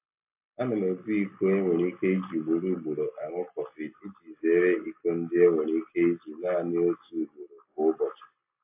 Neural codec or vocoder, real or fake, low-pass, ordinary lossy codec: none; real; 3.6 kHz; AAC, 32 kbps